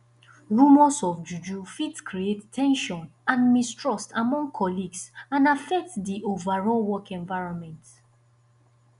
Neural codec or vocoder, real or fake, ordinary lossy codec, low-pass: none; real; none; 10.8 kHz